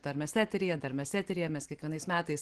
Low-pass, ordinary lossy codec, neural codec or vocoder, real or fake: 10.8 kHz; Opus, 16 kbps; none; real